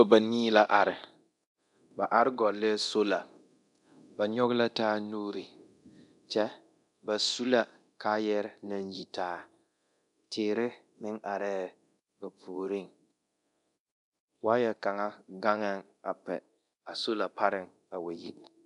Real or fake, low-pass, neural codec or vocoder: fake; 10.8 kHz; codec, 24 kHz, 0.9 kbps, DualCodec